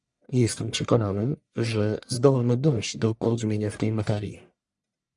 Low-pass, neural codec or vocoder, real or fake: 10.8 kHz; codec, 44.1 kHz, 1.7 kbps, Pupu-Codec; fake